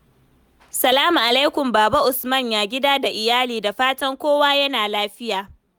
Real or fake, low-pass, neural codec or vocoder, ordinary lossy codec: real; none; none; none